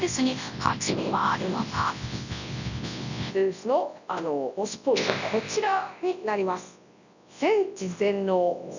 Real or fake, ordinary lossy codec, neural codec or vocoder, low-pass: fake; none; codec, 24 kHz, 0.9 kbps, WavTokenizer, large speech release; 7.2 kHz